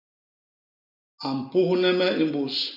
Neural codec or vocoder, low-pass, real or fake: none; 5.4 kHz; real